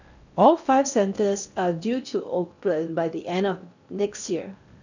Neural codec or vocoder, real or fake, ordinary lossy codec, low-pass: codec, 16 kHz in and 24 kHz out, 0.8 kbps, FocalCodec, streaming, 65536 codes; fake; none; 7.2 kHz